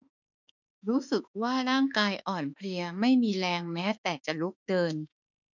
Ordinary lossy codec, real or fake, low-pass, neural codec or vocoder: none; fake; 7.2 kHz; autoencoder, 48 kHz, 32 numbers a frame, DAC-VAE, trained on Japanese speech